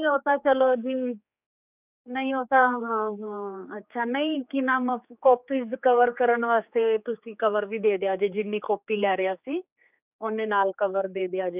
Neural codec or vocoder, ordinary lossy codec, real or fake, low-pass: codec, 16 kHz, 4 kbps, X-Codec, HuBERT features, trained on general audio; none; fake; 3.6 kHz